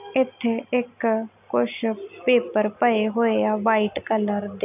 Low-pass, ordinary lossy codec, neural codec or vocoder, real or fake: 3.6 kHz; none; none; real